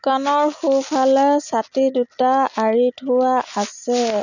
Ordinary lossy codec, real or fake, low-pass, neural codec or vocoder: none; real; 7.2 kHz; none